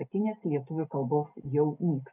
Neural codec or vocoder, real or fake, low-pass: none; real; 3.6 kHz